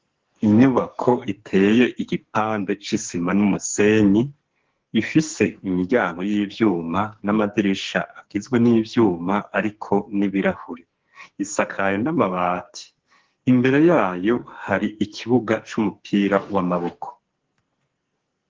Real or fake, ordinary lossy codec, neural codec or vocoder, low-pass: fake; Opus, 16 kbps; codec, 44.1 kHz, 2.6 kbps, SNAC; 7.2 kHz